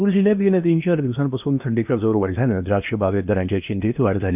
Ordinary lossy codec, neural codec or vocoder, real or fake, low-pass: none; codec, 16 kHz in and 24 kHz out, 0.8 kbps, FocalCodec, streaming, 65536 codes; fake; 3.6 kHz